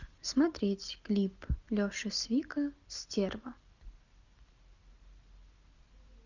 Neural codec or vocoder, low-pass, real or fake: none; 7.2 kHz; real